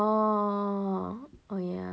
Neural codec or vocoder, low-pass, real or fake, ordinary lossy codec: none; none; real; none